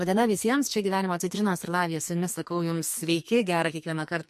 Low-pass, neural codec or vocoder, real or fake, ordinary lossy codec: 14.4 kHz; codec, 44.1 kHz, 2.6 kbps, SNAC; fake; MP3, 64 kbps